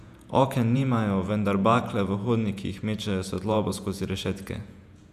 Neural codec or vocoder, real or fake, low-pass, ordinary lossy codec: vocoder, 48 kHz, 128 mel bands, Vocos; fake; 14.4 kHz; none